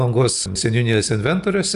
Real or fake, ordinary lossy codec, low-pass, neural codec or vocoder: real; AAC, 96 kbps; 10.8 kHz; none